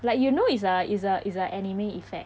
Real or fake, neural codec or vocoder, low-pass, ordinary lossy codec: real; none; none; none